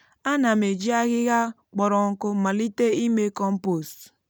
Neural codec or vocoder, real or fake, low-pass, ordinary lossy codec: none; real; none; none